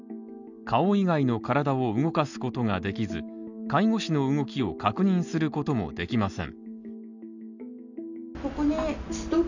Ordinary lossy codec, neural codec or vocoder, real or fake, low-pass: none; none; real; 7.2 kHz